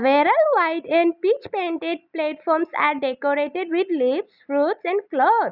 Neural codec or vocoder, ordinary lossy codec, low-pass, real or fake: none; none; 5.4 kHz; real